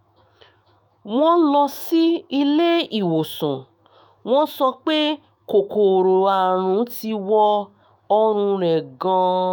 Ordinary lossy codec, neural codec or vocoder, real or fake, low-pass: none; autoencoder, 48 kHz, 128 numbers a frame, DAC-VAE, trained on Japanese speech; fake; none